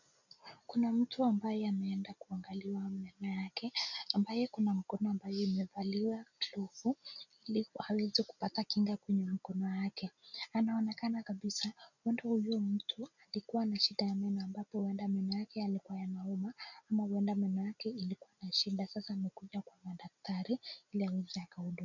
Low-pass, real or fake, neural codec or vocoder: 7.2 kHz; real; none